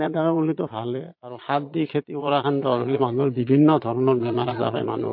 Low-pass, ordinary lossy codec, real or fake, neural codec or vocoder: 5.4 kHz; MP3, 32 kbps; fake; vocoder, 22.05 kHz, 80 mel bands, Vocos